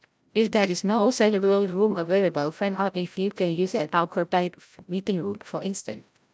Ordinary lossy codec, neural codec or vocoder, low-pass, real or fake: none; codec, 16 kHz, 0.5 kbps, FreqCodec, larger model; none; fake